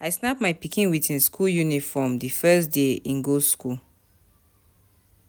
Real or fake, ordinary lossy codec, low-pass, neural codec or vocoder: real; none; none; none